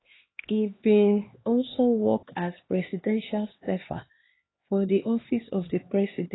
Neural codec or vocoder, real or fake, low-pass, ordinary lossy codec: codec, 16 kHz, 2 kbps, X-Codec, HuBERT features, trained on LibriSpeech; fake; 7.2 kHz; AAC, 16 kbps